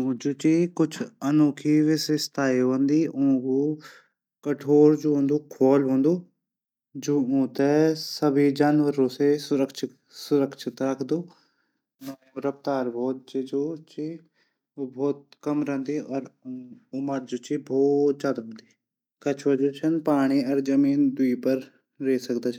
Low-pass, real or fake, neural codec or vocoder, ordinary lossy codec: 19.8 kHz; real; none; none